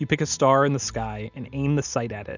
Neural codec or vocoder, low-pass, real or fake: none; 7.2 kHz; real